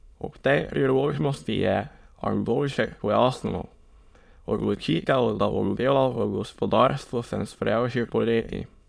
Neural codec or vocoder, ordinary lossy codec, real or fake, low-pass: autoencoder, 22.05 kHz, a latent of 192 numbers a frame, VITS, trained on many speakers; none; fake; none